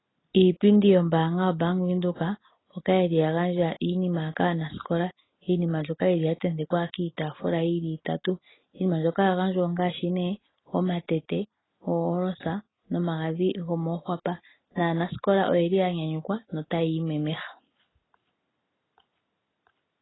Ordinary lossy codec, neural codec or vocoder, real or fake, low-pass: AAC, 16 kbps; none; real; 7.2 kHz